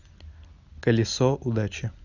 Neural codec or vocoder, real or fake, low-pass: none; real; 7.2 kHz